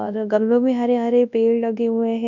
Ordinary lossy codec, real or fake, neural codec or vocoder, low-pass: none; fake; codec, 24 kHz, 0.9 kbps, WavTokenizer, large speech release; 7.2 kHz